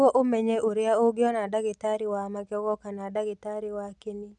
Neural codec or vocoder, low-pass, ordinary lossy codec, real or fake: none; 10.8 kHz; none; real